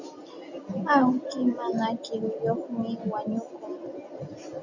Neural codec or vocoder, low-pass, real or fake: none; 7.2 kHz; real